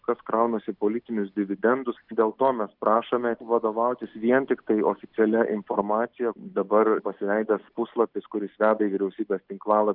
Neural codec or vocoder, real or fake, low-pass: none; real; 5.4 kHz